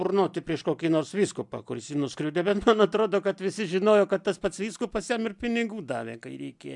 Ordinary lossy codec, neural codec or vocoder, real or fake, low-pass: MP3, 96 kbps; none; real; 10.8 kHz